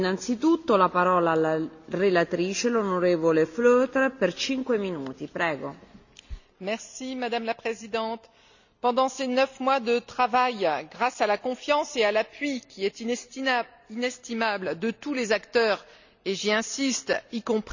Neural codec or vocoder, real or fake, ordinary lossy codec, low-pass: none; real; none; 7.2 kHz